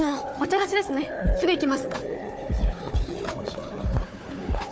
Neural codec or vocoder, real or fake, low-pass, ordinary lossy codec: codec, 16 kHz, 4 kbps, FunCodec, trained on Chinese and English, 50 frames a second; fake; none; none